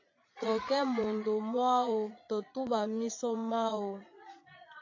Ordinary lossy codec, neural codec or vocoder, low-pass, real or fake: AAC, 48 kbps; vocoder, 22.05 kHz, 80 mel bands, Vocos; 7.2 kHz; fake